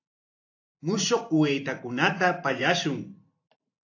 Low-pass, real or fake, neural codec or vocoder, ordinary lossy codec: 7.2 kHz; fake; vocoder, 44.1 kHz, 128 mel bands every 512 samples, BigVGAN v2; AAC, 48 kbps